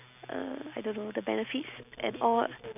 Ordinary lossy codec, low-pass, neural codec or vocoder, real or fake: none; 3.6 kHz; none; real